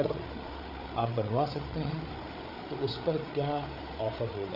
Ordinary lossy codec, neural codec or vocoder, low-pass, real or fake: none; codec, 16 kHz, 8 kbps, FreqCodec, larger model; 5.4 kHz; fake